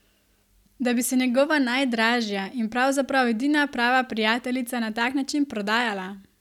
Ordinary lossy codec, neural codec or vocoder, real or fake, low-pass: none; none; real; 19.8 kHz